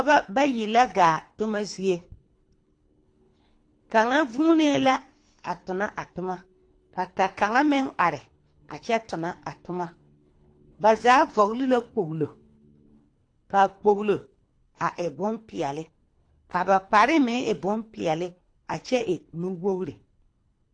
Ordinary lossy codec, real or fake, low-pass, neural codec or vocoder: AAC, 48 kbps; fake; 9.9 kHz; codec, 24 kHz, 3 kbps, HILCodec